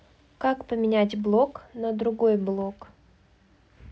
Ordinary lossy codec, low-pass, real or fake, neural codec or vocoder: none; none; real; none